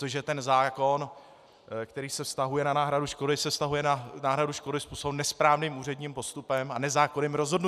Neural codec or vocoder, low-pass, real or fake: none; 14.4 kHz; real